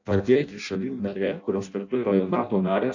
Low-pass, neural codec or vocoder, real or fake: 7.2 kHz; codec, 16 kHz in and 24 kHz out, 0.6 kbps, FireRedTTS-2 codec; fake